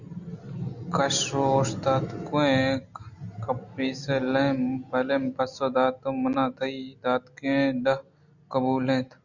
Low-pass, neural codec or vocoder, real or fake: 7.2 kHz; none; real